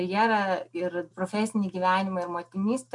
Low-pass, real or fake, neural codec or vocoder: 10.8 kHz; real; none